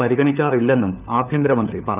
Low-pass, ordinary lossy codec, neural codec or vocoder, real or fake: 3.6 kHz; none; codec, 16 kHz, 4 kbps, FunCodec, trained on LibriTTS, 50 frames a second; fake